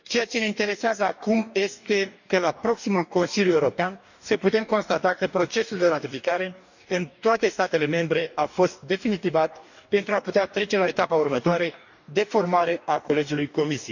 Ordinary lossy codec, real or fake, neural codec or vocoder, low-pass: none; fake; codec, 44.1 kHz, 2.6 kbps, DAC; 7.2 kHz